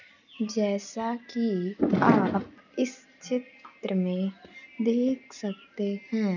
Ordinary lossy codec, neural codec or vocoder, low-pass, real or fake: none; none; 7.2 kHz; real